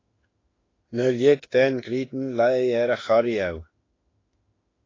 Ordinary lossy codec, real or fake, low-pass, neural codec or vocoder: AAC, 32 kbps; fake; 7.2 kHz; autoencoder, 48 kHz, 32 numbers a frame, DAC-VAE, trained on Japanese speech